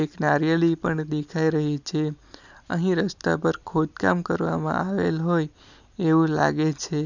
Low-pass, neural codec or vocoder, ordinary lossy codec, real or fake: 7.2 kHz; none; none; real